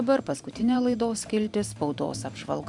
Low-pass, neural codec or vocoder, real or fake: 10.8 kHz; vocoder, 44.1 kHz, 128 mel bands every 512 samples, BigVGAN v2; fake